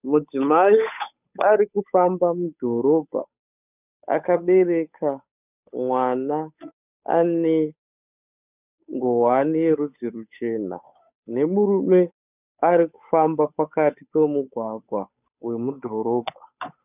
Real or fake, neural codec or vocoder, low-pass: fake; codec, 16 kHz, 8 kbps, FunCodec, trained on Chinese and English, 25 frames a second; 3.6 kHz